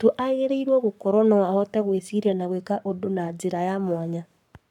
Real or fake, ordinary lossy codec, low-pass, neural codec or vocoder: fake; none; 19.8 kHz; codec, 44.1 kHz, 7.8 kbps, Pupu-Codec